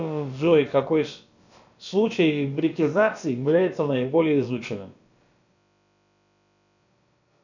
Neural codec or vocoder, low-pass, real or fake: codec, 16 kHz, about 1 kbps, DyCAST, with the encoder's durations; 7.2 kHz; fake